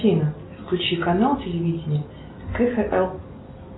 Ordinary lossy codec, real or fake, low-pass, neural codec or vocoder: AAC, 16 kbps; real; 7.2 kHz; none